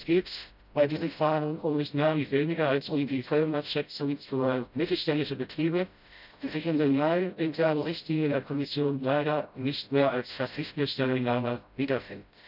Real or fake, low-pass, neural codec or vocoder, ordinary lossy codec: fake; 5.4 kHz; codec, 16 kHz, 0.5 kbps, FreqCodec, smaller model; none